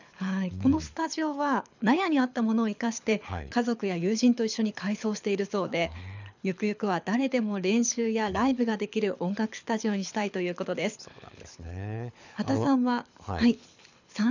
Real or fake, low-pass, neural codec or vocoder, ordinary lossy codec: fake; 7.2 kHz; codec, 24 kHz, 6 kbps, HILCodec; none